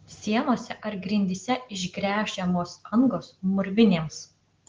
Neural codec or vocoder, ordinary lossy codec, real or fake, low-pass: none; Opus, 16 kbps; real; 7.2 kHz